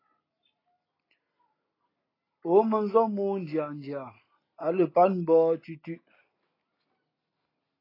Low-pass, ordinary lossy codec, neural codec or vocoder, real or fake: 5.4 kHz; AAC, 24 kbps; none; real